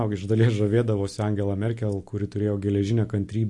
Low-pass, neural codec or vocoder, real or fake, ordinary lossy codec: 10.8 kHz; none; real; MP3, 48 kbps